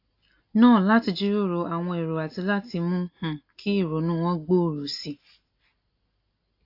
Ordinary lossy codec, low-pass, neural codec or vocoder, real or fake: AAC, 32 kbps; 5.4 kHz; none; real